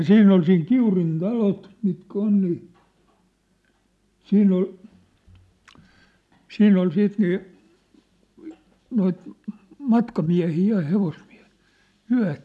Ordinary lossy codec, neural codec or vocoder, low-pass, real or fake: none; none; none; real